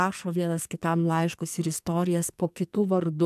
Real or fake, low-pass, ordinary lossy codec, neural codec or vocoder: fake; 14.4 kHz; MP3, 96 kbps; codec, 44.1 kHz, 2.6 kbps, SNAC